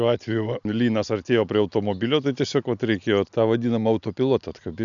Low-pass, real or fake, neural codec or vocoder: 7.2 kHz; real; none